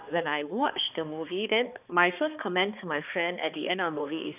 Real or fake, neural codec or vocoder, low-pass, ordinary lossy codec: fake; codec, 16 kHz, 2 kbps, X-Codec, HuBERT features, trained on balanced general audio; 3.6 kHz; none